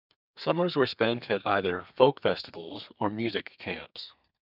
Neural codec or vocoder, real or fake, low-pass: codec, 32 kHz, 1.9 kbps, SNAC; fake; 5.4 kHz